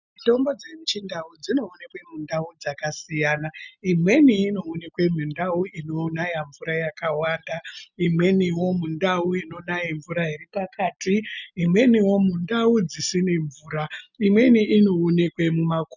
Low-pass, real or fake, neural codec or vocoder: 7.2 kHz; real; none